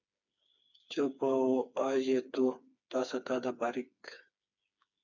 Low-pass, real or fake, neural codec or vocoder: 7.2 kHz; fake; codec, 16 kHz, 4 kbps, FreqCodec, smaller model